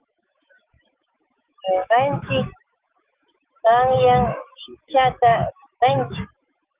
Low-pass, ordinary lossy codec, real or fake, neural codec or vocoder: 3.6 kHz; Opus, 32 kbps; fake; autoencoder, 48 kHz, 128 numbers a frame, DAC-VAE, trained on Japanese speech